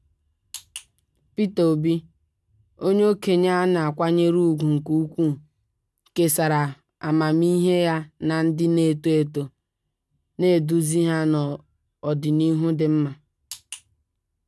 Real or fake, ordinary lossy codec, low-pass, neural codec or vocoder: real; none; none; none